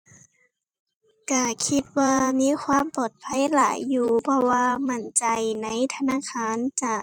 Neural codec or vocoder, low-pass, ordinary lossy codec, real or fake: vocoder, 44.1 kHz, 128 mel bands every 512 samples, BigVGAN v2; 19.8 kHz; none; fake